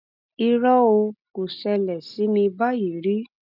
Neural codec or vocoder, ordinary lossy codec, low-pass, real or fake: none; none; 5.4 kHz; real